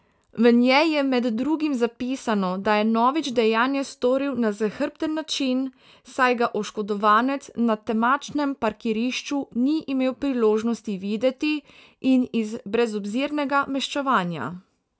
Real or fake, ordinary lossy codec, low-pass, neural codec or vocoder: real; none; none; none